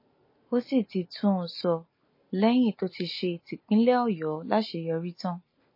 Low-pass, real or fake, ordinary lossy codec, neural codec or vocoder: 5.4 kHz; real; MP3, 24 kbps; none